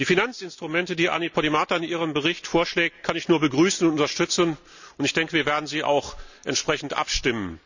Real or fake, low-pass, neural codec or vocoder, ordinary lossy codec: real; 7.2 kHz; none; none